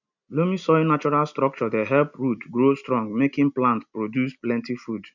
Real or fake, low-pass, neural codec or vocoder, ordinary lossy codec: real; 7.2 kHz; none; none